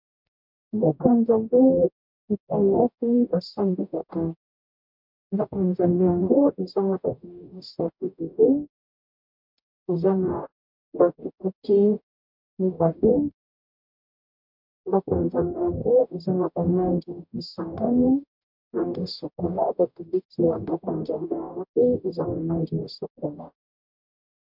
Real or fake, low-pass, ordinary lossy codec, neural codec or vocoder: fake; 5.4 kHz; MP3, 48 kbps; codec, 44.1 kHz, 0.9 kbps, DAC